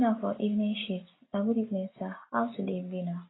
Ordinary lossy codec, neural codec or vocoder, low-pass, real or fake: AAC, 16 kbps; none; 7.2 kHz; real